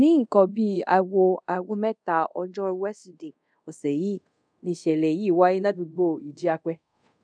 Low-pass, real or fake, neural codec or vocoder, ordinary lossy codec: 9.9 kHz; fake; codec, 24 kHz, 0.5 kbps, DualCodec; none